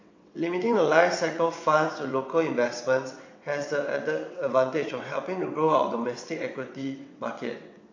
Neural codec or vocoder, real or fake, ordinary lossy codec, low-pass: vocoder, 22.05 kHz, 80 mel bands, WaveNeXt; fake; AAC, 48 kbps; 7.2 kHz